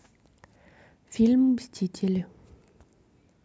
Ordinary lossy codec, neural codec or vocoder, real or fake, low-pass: none; none; real; none